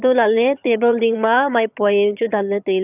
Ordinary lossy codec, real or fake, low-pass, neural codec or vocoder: none; fake; 3.6 kHz; vocoder, 22.05 kHz, 80 mel bands, HiFi-GAN